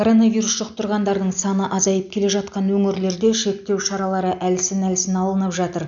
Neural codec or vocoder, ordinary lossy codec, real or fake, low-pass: none; none; real; 7.2 kHz